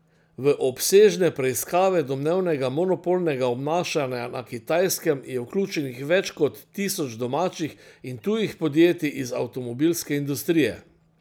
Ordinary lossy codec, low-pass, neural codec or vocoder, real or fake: none; none; none; real